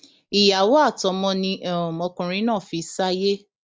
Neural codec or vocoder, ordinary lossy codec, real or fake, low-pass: none; none; real; none